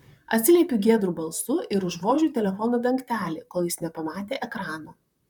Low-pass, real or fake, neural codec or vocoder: 19.8 kHz; fake; vocoder, 44.1 kHz, 128 mel bands, Pupu-Vocoder